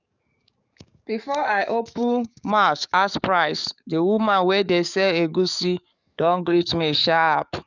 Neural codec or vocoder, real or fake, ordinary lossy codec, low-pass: codec, 44.1 kHz, 7.8 kbps, DAC; fake; none; 7.2 kHz